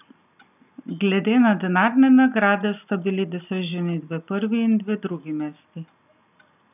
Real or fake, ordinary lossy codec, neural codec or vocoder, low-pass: real; none; none; 3.6 kHz